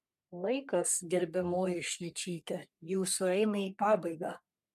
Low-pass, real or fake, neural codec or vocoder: 14.4 kHz; fake; codec, 44.1 kHz, 3.4 kbps, Pupu-Codec